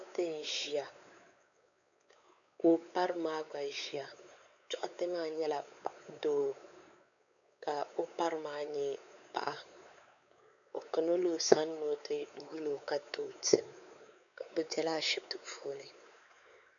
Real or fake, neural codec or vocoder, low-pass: fake; codec, 16 kHz, 4 kbps, X-Codec, WavLM features, trained on Multilingual LibriSpeech; 7.2 kHz